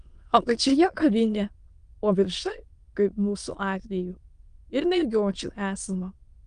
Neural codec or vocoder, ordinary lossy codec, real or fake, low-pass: autoencoder, 22.05 kHz, a latent of 192 numbers a frame, VITS, trained on many speakers; Opus, 32 kbps; fake; 9.9 kHz